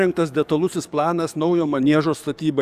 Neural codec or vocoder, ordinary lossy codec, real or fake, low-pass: codec, 44.1 kHz, 7.8 kbps, DAC; Opus, 64 kbps; fake; 14.4 kHz